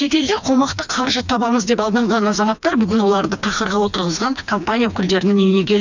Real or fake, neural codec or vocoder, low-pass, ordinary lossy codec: fake; codec, 16 kHz, 2 kbps, FreqCodec, smaller model; 7.2 kHz; none